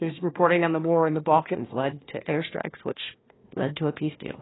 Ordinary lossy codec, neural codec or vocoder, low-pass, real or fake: AAC, 16 kbps; codec, 16 kHz, 1 kbps, FunCodec, trained on Chinese and English, 50 frames a second; 7.2 kHz; fake